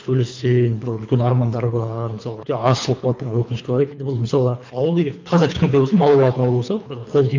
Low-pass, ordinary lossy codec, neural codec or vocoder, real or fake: 7.2 kHz; MP3, 64 kbps; codec, 24 kHz, 3 kbps, HILCodec; fake